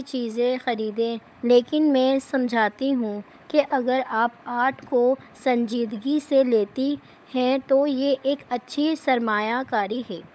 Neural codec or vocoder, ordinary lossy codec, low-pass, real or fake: codec, 16 kHz, 16 kbps, FunCodec, trained on LibriTTS, 50 frames a second; none; none; fake